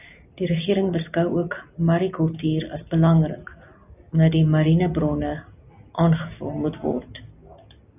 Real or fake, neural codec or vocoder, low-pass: real; none; 3.6 kHz